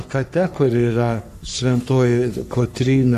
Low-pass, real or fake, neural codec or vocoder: 14.4 kHz; fake; codec, 44.1 kHz, 3.4 kbps, Pupu-Codec